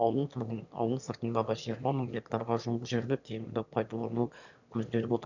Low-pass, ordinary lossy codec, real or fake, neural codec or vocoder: 7.2 kHz; none; fake; autoencoder, 22.05 kHz, a latent of 192 numbers a frame, VITS, trained on one speaker